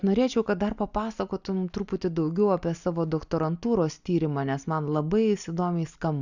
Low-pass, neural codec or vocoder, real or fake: 7.2 kHz; none; real